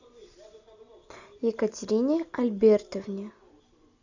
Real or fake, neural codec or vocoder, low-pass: real; none; 7.2 kHz